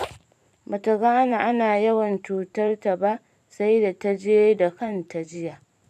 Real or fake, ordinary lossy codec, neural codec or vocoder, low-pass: fake; none; vocoder, 44.1 kHz, 128 mel bands every 512 samples, BigVGAN v2; 14.4 kHz